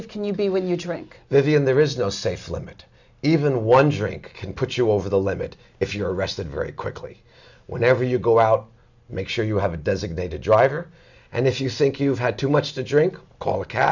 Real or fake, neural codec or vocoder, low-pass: real; none; 7.2 kHz